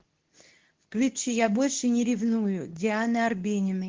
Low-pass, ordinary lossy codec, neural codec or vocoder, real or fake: 7.2 kHz; Opus, 16 kbps; codec, 24 kHz, 0.9 kbps, WavTokenizer, medium speech release version 2; fake